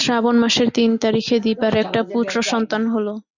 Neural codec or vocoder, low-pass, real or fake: none; 7.2 kHz; real